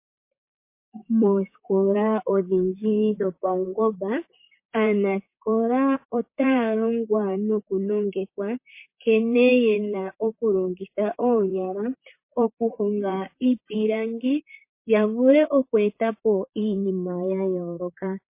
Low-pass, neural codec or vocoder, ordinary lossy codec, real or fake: 3.6 kHz; vocoder, 44.1 kHz, 128 mel bands, Pupu-Vocoder; MP3, 24 kbps; fake